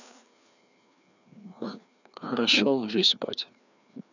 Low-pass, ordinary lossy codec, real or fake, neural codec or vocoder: 7.2 kHz; none; fake; codec, 16 kHz, 2 kbps, FreqCodec, larger model